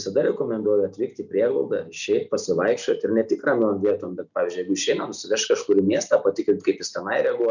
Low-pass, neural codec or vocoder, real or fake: 7.2 kHz; none; real